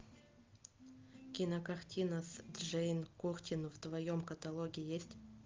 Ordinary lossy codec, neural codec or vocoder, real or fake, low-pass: Opus, 24 kbps; none; real; 7.2 kHz